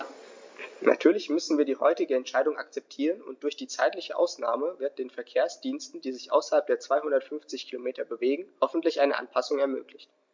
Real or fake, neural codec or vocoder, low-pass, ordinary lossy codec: real; none; 7.2 kHz; MP3, 64 kbps